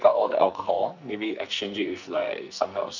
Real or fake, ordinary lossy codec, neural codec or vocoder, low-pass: fake; none; codec, 44.1 kHz, 2.6 kbps, SNAC; 7.2 kHz